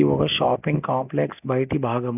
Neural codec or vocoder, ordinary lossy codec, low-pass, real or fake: none; none; 3.6 kHz; real